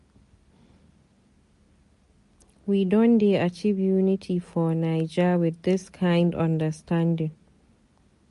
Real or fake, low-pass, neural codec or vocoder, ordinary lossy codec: real; 14.4 kHz; none; MP3, 48 kbps